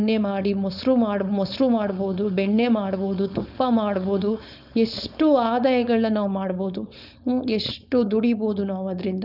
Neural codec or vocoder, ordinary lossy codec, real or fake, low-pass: codec, 16 kHz, 4.8 kbps, FACodec; none; fake; 5.4 kHz